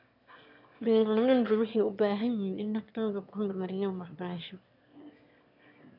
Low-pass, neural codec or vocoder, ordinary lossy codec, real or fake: 5.4 kHz; autoencoder, 22.05 kHz, a latent of 192 numbers a frame, VITS, trained on one speaker; none; fake